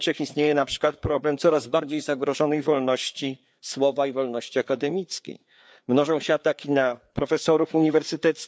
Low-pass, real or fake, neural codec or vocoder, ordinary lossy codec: none; fake; codec, 16 kHz, 4 kbps, FreqCodec, larger model; none